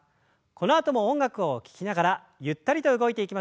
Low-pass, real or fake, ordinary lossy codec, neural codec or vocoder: none; real; none; none